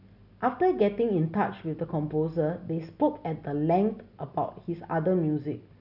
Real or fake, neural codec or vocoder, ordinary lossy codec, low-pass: real; none; none; 5.4 kHz